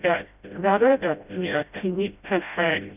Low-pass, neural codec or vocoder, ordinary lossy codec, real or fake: 3.6 kHz; codec, 16 kHz, 0.5 kbps, FreqCodec, smaller model; none; fake